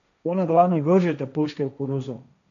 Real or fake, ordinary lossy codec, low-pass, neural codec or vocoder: fake; none; 7.2 kHz; codec, 16 kHz, 1.1 kbps, Voila-Tokenizer